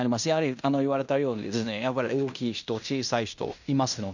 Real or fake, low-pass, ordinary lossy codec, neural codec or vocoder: fake; 7.2 kHz; none; codec, 16 kHz in and 24 kHz out, 0.9 kbps, LongCat-Audio-Codec, fine tuned four codebook decoder